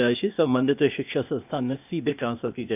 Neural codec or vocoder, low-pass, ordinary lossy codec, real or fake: codec, 16 kHz, 0.8 kbps, ZipCodec; 3.6 kHz; none; fake